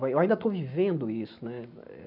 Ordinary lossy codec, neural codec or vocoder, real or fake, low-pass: none; none; real; 5.4 kHz